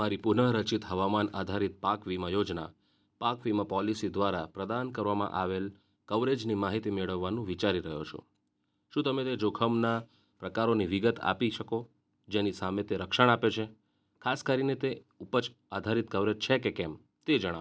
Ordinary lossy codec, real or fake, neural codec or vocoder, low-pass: none; real; none; none